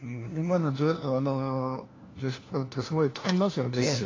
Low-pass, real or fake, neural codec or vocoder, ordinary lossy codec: 7.2 kHz; fake; codec, 16 kHz, 1 kbps, FunCodec, trained on LibriTTS, 50 frames a second; AAC, 32 kbps